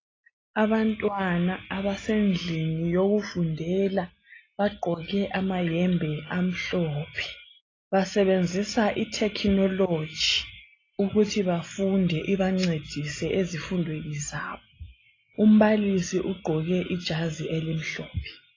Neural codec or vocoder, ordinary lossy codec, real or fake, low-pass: none; AAC, 32 kbps; real; 7.2 kHz